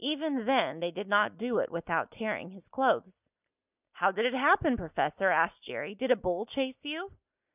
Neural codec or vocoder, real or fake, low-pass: none; real; 3.6 kHz